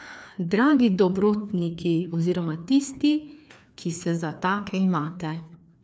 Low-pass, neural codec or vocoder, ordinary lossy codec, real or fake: none; codec, 16 kHz, 2 kbps, FreqCodec, larger model; none; fake